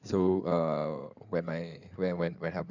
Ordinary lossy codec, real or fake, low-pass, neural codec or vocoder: none; fake; 7.2 kHz; codec, 16 kHz in and 24 kHz out, 2.2 kbps, FireRedTTS-2 codec